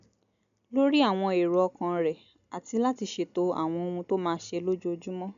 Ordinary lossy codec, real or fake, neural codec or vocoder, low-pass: none; real; none; 7.2 kHz